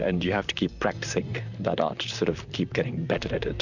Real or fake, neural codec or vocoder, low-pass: fake; vocoder, 44.1 kHz, 128 mel bands, Pupu-Vocoder; 7.2 kHz